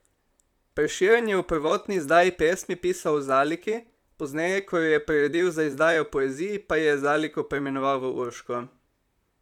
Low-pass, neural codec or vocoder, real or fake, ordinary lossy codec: 19.8 kHz; vocoder, 44.1 kHz, 128 mel bands, Pupu-Vocoder; fake; none